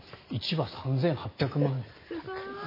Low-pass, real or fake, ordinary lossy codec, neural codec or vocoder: 5.4 kHz; real; MP3, 24 kbps; none